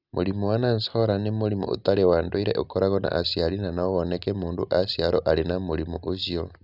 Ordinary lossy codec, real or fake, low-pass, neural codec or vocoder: none; real; 5.4 kHz; none